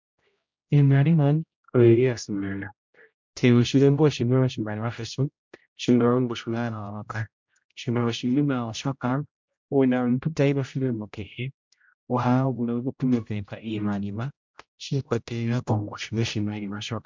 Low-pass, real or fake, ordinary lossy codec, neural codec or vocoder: 7.2 kHz; fake; MP3, 64 kbps; codec, 16 kHz, 0.5 kbps, X-Codec, HuBERT features, trained on general audio